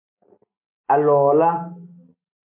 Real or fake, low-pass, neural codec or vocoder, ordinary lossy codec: real; 3.6 kHz; none; MP3, 32 kbps